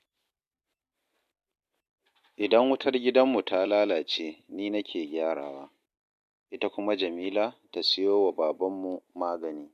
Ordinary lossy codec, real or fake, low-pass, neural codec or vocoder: MP3, 64 kbps; real; 14.4 kHz; none